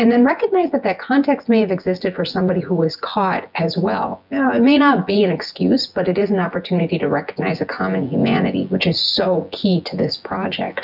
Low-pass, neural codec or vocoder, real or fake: 5.4 kHz; vocoder, 24 kHz, 100 mel bands, Vocos; fake